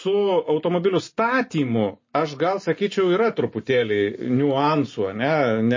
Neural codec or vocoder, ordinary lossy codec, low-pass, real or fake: none; MP3, 32 kbps; 7.2 kHz; real